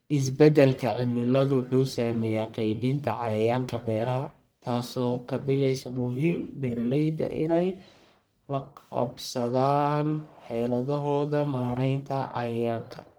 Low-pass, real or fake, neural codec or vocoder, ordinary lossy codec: none; fake; codec, 44.1 kHz, 1.7 kbps, Pupu-Codec; none